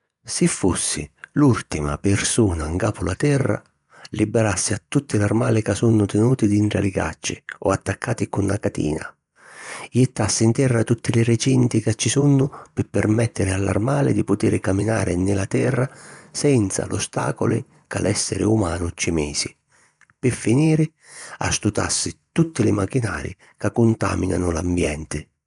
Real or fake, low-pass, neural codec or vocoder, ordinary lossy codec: fake; 10.8 kHz; vocoder, 24 kHz, 100 mel bands, Vocos; none